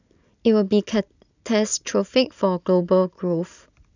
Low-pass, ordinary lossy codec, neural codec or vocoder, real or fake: 7.2 kHz; none; none; real